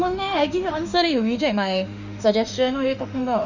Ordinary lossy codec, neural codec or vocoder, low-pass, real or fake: none; autoencoder, 48 kHz, 32 numbers a frame, DAC-VAE, trained on Japanese speech; 7.2 kHz; fake